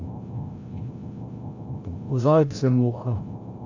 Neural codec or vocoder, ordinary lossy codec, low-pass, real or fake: codec, 16 kHz, 0.5 kbps, FreqCodec, larger model; AAC, 48 kbps; 7.2 kHz; fake